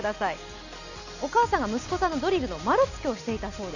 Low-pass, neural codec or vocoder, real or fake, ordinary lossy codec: 7.2 kHz; none; real; none